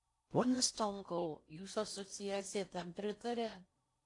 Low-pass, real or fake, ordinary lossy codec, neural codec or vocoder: 10.8 kHz; fake; AAC, 48 kbps; codec, 16 kHz in and 24 kHz out, 0.6 kbps, FocalCodec, streaming, 4096 codes